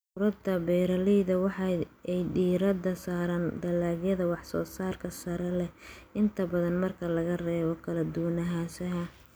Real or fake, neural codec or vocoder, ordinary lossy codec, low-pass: real; none; none; none